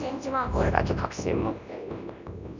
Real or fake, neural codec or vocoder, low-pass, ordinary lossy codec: fake; codec, 24 kHz, 0.9 kbps, WavTokenizer, large speech release; 7.2 kHz; none